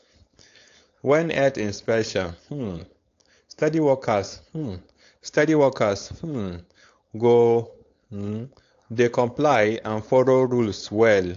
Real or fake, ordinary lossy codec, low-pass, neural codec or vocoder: fake; AAC, 48 kbps; 7.2 kHz; codec, 16 kHz, 4.8 kbps, FACodec